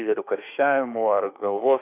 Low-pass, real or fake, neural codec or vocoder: 3.6 kHz; fake; autoencoder, 48 kHz, 32 numbers a frame, DAC-VAE, trained on Japanese speech